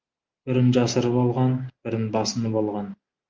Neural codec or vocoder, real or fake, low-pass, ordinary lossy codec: none; real; 7.2 kHz; Opus, 32 kbps